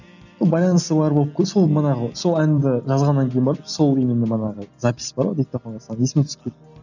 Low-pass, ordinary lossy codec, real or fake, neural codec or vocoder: 7.2 kHz; none; real; none